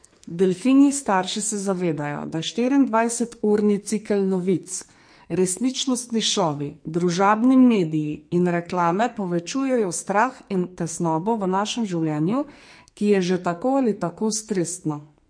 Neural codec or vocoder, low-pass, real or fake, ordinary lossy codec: codec, 44.1 kHz, 2.6 kbps, SNAC; 9.9 kHz; fake; MP3, 48 kbps